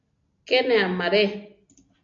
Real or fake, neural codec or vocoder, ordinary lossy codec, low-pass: real; none; AAC, 48 kbps; 7.2 kHz